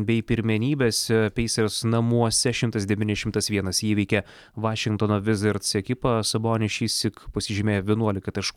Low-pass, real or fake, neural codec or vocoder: 19.8 kHz; real; none